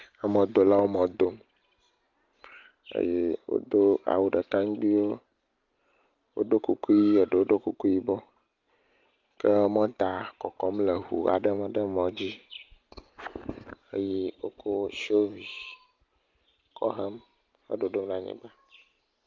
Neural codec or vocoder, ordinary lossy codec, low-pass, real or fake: none; Opus, 32 kbps; 7.2 kHz; real